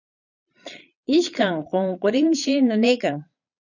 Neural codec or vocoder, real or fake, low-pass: vocoder, 22.05 kHz, 80 mel bands, Vocos; fake; 7.2 kHz